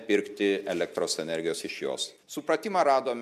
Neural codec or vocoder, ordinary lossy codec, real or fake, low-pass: none; MP3, 96 kbps; real; 14.4 kHz